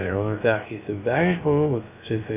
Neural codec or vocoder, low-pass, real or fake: codec, 16 kHz, 0.3 kbps, FocalCodec; 3.6 kHz; fake